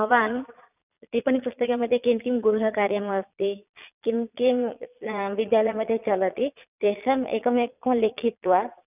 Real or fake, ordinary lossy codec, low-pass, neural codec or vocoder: fake; none; 3.6 kHz; vocoder, 22.05 kHz, 80 mel bands, Vocos